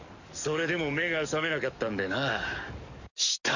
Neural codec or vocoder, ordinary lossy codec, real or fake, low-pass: codec, 44.1 kHz, 7.8 kbps, DAC; none; fake; 7.2 kHz